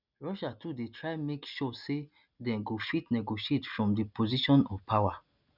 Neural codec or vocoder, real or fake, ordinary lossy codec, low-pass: none; real; none; 5.4 kHz